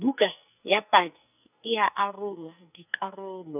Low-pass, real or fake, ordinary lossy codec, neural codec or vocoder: 3.6 kHz; fake; none; codec, 44.1 kHz, 2.6 kbps, SNAC